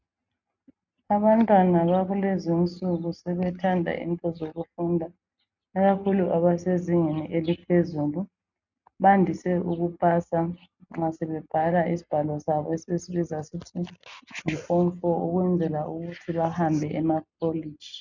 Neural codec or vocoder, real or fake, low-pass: none; real; 7.2 kHz